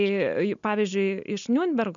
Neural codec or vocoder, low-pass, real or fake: none; 7.2 kHz; real